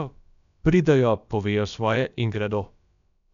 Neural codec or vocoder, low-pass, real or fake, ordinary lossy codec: codec, 16 kHz, about 1 kbps, DyCAST, with the encoder's durations; 7.2 kHz; fake; none